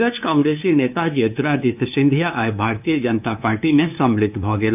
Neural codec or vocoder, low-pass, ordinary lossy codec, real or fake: codec, 16 kHz, 2 kbps, FunCodec, trained on LibriTTS, 25 frames a second; 3.6 kHz; none; fake